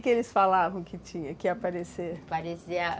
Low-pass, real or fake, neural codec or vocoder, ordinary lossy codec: none; real; none; none